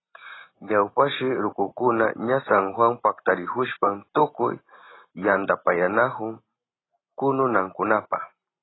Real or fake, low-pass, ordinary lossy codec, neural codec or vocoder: real; 7.2 kHz; AAC, 16 kbps; none